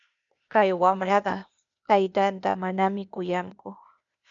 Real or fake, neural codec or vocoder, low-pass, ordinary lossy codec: fake; codec, 16 kHz, 0.8 kbps, ZipCodec; 7.2 kHz; MP3, 96 kbps